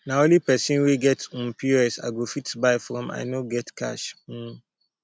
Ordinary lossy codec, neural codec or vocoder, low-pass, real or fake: none; none; none; real